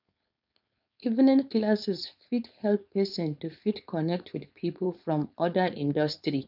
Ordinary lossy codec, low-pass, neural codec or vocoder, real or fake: none; 5.4 kHz; codec, 16 kHz, 4.8 kbps, FACodec; fake